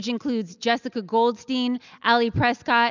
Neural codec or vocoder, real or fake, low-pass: none; real; 7.2 kHz